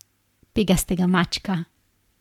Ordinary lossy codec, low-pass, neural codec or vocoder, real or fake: none; 19.8 kHz; codec, 44.1 kHz, 7.8 kbps, Pupu-Codec; fake